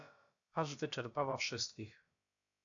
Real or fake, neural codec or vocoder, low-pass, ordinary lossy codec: fake; codec, 16 kHz, about 1 kbps, DyCAST, with the encoder's durations; 7.2 kHz; AAC, 64 kbps